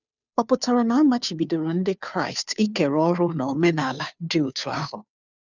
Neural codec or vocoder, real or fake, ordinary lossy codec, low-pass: codec, 16 kHz, 2 kbps, FunCodec, trained on Chinese and English, 25 frames a second; fake; none; 7.2 kHz